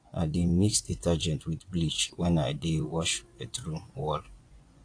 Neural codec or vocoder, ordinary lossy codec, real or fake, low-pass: vocoder, 24 kHz, 100 mel bands, Vocos; AAC, 48 kbps; fake; 9.9 kHz